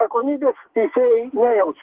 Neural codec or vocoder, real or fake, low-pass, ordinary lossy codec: codec, 44.1 kHz, 2.6 kbps, SNAC; fake; 3.6 kHz; Opus, 16 kbps